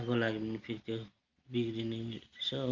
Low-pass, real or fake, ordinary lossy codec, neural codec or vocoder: 7.2 kHz; real; Opus, 32 kbps; none